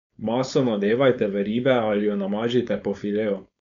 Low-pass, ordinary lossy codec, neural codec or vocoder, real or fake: 7.2 kHz; none; codec, 16 kHz, 4.8 kbps, FACodec; fake